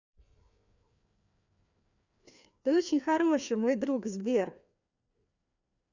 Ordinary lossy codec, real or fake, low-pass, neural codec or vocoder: none; fake; 7.2 kHz; codec, 16 kHz, 2 kbps, FreqCodec, larger model